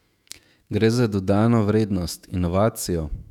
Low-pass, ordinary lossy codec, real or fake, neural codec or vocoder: 19.8 kHz; none; fake; autoencoder, 48 kHz, 128 numbers a frame, DAC-VAE, trained on Japanese speech